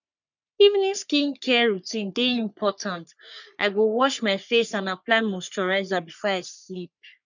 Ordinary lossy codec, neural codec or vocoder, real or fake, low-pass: none; codec, 44.1 kHz, 3.4 kbps, Pupu-Codec; fake; 7.2 kHz